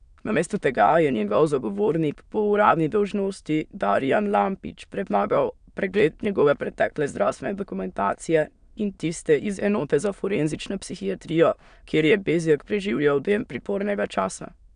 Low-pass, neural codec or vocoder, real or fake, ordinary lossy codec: 9.9 kHz; autoencoder, 22.05 kHz, a latent of 192 numbers a frame, VITS, trained on many speakers; fake; none